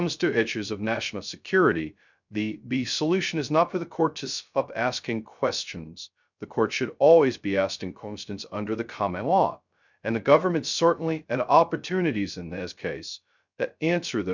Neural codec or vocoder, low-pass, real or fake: codec, 16 kHz, 0.2 kbps, FocalCodec; 7.2 kHz; fake